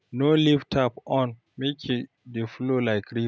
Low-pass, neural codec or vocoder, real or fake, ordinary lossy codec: none; none; real; none